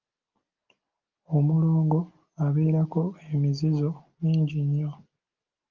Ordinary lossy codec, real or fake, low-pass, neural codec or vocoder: Opus, 24 kbps; real; 7.2 kHz; none